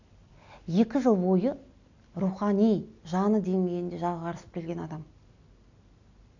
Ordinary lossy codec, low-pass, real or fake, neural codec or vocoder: none; 7.2 kHz; real; none